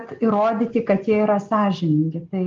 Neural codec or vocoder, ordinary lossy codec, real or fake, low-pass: none; Opus, 32 kbps; real; 7.2 kHz